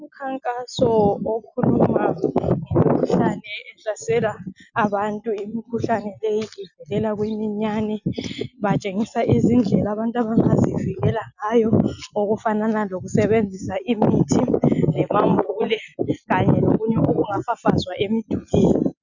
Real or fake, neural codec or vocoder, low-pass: real; none; 7.2 kHz